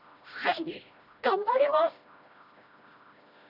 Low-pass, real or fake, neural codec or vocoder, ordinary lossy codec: 5.4 kHz; fake; codec, 16 kHz, 1 kbps, FreqCodec, smaller model; none